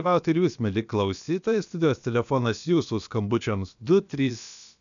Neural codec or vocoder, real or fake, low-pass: codec, 16 kHz, about 1 kbps, DyCAST, with the encoder's durations; fake; 7.2 kHz